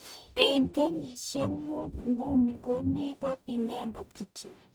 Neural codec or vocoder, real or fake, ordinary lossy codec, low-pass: codec, 44.1 kHz, 0.9 kbps, DAC; fake; none; none